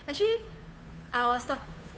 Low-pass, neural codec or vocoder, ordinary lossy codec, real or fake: none; codec, 16 kHz, 2 kbps, FunCodec, trained on Chinese and English, 25 frames a second; none; fake